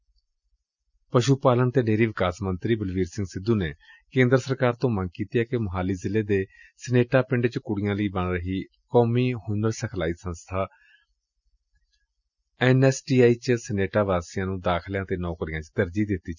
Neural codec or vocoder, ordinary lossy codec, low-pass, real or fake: none; none; 7.2 kHz; real